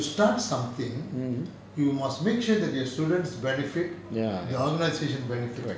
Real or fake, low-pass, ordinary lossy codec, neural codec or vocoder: real; none; none; none